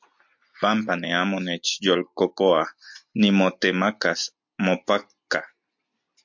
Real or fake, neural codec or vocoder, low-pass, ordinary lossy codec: real; none; 7.2 kHz; MP3, 48 kbps